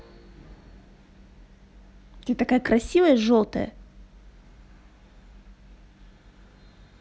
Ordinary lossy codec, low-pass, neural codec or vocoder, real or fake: none; none; none; real